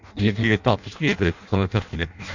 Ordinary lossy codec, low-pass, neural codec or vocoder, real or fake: none; 7.2 kHz; codec, 16 kHz in and 24 kHz out, 0.6 kbps, FireRedTTS-2 codec; fake